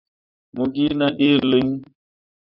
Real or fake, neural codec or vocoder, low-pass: fake; vocoder, 44.1 kHz, 80 mel bands, Vocos; 5.4 kHz